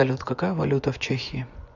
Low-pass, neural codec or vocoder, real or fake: 7.2 kHz; vocoder, 24 kHz, 100 mel bands, Vocos; fake